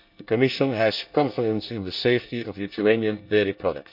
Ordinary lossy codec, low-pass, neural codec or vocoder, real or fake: none; 5.4 kHz; codec, 24 kHz, 1 kbps, SNAC; fake